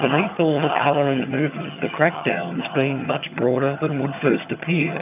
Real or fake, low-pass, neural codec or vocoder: fake; 3.6 kHz; vocoder, 22.05 kHz, 80 mel bands, HiFi-GAN